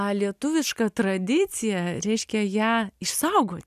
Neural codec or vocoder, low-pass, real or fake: none; 14.4 kHz; real